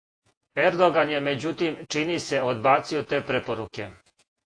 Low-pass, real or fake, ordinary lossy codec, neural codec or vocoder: 9.9 kHz; fake; AAC, 48 kbps; vocoder, 48 kHz, 128 mel bands, Vocos